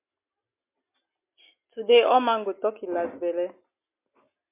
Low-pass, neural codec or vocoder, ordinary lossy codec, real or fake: 3.6 kHz; none; MP3, 24 kbps; real